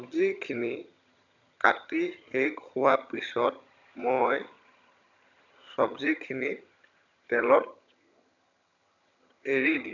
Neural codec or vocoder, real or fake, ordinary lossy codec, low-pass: vocoder, 22.05 kHz, 80 mel bands, HiFi-GAN; fake; none; 7.2 kHz